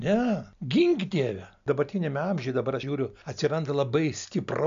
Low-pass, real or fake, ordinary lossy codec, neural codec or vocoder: 7.2 kHz; real; MP3, 64 kbps; none